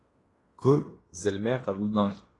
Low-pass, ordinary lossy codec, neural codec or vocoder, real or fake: 10.8 kHz; AAC, 32 kbps; codec, 16 kHz in and 24 kHz out, 0.9 kbps, LongCat-Audio-Codec, fine tuned four codebook decoder; fake